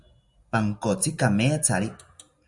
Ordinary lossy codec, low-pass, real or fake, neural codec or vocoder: Opus, 64 kbps; 10.8 kHz; real; none